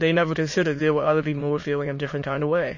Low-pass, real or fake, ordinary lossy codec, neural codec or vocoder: 7.2 kHz; fake; MP3, 32 kbps; autoencoder, 22.05 kHz, a latent of 192 numbers a frame, VITS, trained on many speakers